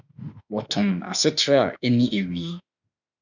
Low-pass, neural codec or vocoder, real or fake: 7.2 kHz; autoencoder, 48 kHz, 32 numbers a frame, DAC-VAE, trained on Japanese speech; fake